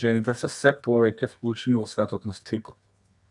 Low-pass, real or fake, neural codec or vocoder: 10.8 kHz; fake; codec, 24 kHz, 0.9 kbps, WavTokenizer, medium music audio release